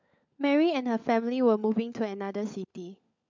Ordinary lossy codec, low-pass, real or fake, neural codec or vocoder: MP3, 64 kbps; 7.2 kHz; fake; codec, 16 kHz, 16 kbps, FunCodec, trained on LibriTTS, 50 frames a second